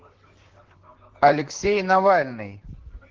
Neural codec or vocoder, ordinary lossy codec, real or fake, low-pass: vocoder, 44.1 kHz, 128 mel bands, Pupu-Vocoder; Opus, 16 kbps; fake; 7.2 kHz